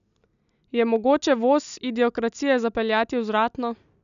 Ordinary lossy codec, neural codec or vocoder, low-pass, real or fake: none; none; 7.2 kHz; real